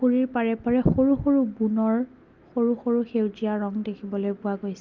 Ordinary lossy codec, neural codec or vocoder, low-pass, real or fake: Opus, 24 kbps; none; 7.2 kHz; real